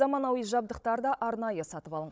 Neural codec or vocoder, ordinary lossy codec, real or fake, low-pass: codec, 16 kHz, 16 kbps, FunCodec, trained on Chinese and English, 50 frames a second; none; fake; none